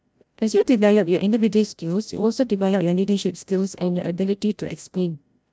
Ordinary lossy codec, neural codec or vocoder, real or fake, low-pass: none; codec, 16 kHz, 0.5 kbps, FreqCodec, larger model; fake; none